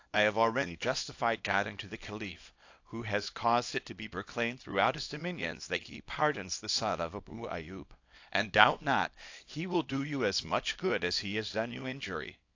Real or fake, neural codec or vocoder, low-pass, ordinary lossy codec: fake; codec, 24 kHz, 0.9 kbps, WavTokenizer, small release; 7.2 kHz; AAC, 48 kbps